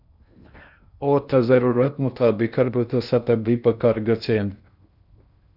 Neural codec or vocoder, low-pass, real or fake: codec, 16 kHz in and 24 kHz out, 0.6 kbps, FocalCodec, streaming, 2048 codes; 5.4 kHz; fake